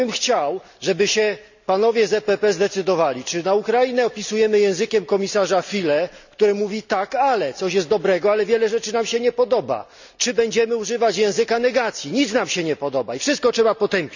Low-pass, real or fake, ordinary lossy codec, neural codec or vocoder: 7.2 kHz; real; none; none